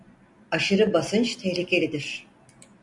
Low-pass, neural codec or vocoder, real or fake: 10.8 kHz; none; real